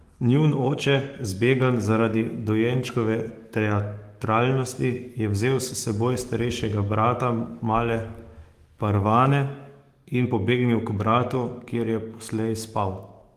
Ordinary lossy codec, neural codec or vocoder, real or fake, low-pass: Opus, 24 kbps; codec, 44.1 kHz, 7.8 kbps, DAC; fake; 14.4 kHz